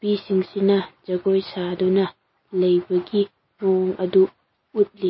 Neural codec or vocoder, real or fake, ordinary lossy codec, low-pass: none; real; MP3, 24 kbps; 7.2 kHz